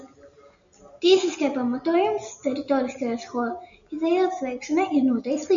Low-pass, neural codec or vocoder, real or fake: 7.2 kHz; none; real